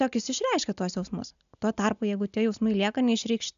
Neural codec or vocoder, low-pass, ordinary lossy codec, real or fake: none; 7.2 kHz; AAC, 96 kbps; real